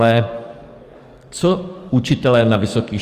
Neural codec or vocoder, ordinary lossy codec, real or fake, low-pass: codec, 44.1 kHz, 7.8 kbps, DAC; Opus, 32 kbps; fake; 14.4 kHz